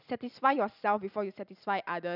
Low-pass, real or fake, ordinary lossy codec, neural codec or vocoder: 5.4 kHz; fake; none; vocoder, 44.1 kHz, 128 mel bands every 256 samples, BigVGAN v2